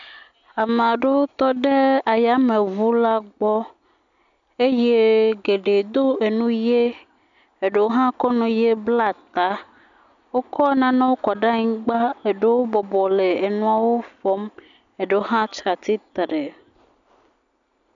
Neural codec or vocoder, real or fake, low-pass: none; real; 7.2 kHz